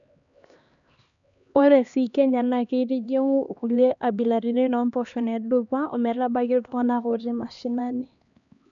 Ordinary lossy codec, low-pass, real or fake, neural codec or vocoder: none; 7.2 kHz; fake; codec, 16 kHz, 2 kbps, X-Codec, HuBERT features, trained on LibriSpeech